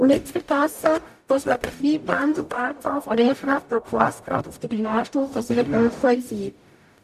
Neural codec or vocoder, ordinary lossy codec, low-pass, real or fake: codec, 44.1 kHz, 0.9 kbps, DAC; none; 14.4 kHz; fake